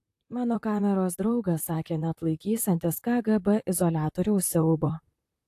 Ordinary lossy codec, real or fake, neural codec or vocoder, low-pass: AAC, 64 kbps; fake; vocoder, 44.1 kHz, 128 mel bands, Pupu-Vocoder; 14.4 kHz